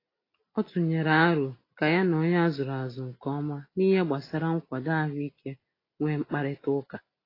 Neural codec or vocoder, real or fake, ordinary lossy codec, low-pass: none; real; AAC, 24 kbps; 5.4 kHz